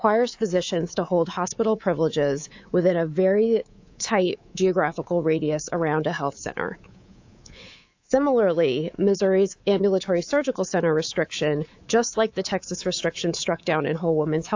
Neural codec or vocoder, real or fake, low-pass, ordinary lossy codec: codec, 24 kHz, 3.1 kbps, DualCodec; fake; 7.2 kHz; AAC, 48 kbps